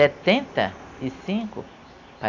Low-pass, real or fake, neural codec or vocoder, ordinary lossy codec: 7.2 kHz; real; none; AAC, 48 kbps